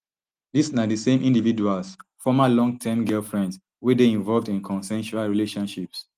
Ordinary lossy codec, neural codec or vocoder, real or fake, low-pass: Opus, 24 kbps; none; real; 14.4 kHz